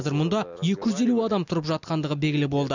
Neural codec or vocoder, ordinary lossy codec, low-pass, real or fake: none; none; 7.2 kHz; real